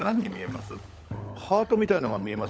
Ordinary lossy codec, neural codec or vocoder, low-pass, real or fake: none; codec, 16 kHz, 16 kbps, FunCodec, trained on LibriTTS, 50 frames a second; none; fake